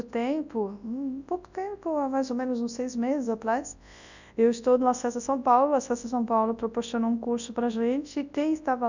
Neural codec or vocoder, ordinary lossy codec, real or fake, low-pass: codec, 24 kHz, 0.9 kbps, WavTokenizer, large speech release; none; fake; 7.2 kHz